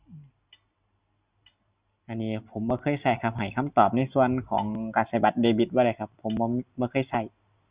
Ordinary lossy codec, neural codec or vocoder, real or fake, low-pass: Opus, 64 kbps; none; real; 3.6 kHz